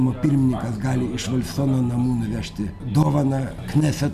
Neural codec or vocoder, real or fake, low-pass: none; real; 14.4 kHz